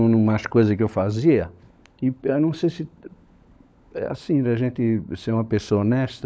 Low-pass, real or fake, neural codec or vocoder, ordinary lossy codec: none; fake; codec, 16 kHz, 16 kbps, FunCodec, trained on LibriTTS, 50 frames a second; none